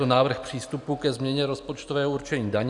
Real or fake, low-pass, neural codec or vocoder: real; 10.8 kHz; none